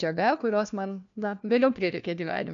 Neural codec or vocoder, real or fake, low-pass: codec, 16 kHz, 1 kbps, FunCodec, trained on LibriTTS, 50 frames a second; fake; 7.2 kHz